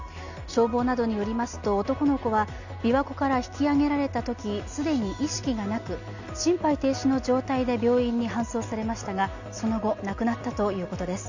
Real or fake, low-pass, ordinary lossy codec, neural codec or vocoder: real; 7.2 kHz; none; none